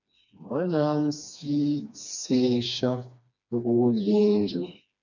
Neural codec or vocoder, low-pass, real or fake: codec, 16 kHz, 2 kbps, FreqCodec, smaller model; 7.2 kHz; fake